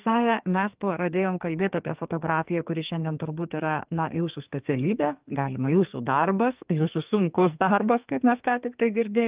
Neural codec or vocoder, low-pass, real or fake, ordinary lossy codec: codec, 44.1 kHz, 2.6 kbps, SNAC; 3.6 kHz; fake; Opus, 32 kbps